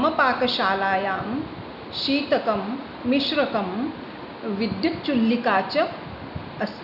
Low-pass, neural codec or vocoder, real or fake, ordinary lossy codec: 5.4 kHz; none; real; none